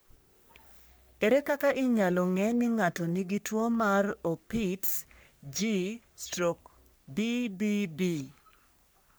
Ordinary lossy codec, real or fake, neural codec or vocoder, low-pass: none; fake; codec, 44.1 kHz, 3.4 kbps, Pupu-Codec; none